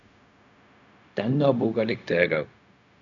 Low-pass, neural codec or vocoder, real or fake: 7.2 kHz; codec, 16 kHz, 0.4 kbps, LongCat-Audio-Codec; fake